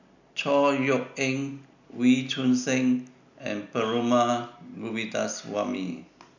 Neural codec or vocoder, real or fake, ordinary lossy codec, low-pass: none; real; none; 7.2 kHz